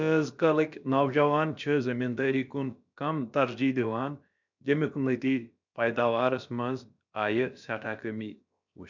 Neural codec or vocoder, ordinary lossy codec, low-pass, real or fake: codec, 16 kHz, about 1 kbps, DyCAST, with the encoder's durations; none; 7.2 kHz; fake